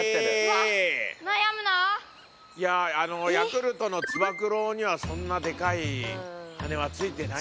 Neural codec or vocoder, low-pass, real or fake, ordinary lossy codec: none; none; real; none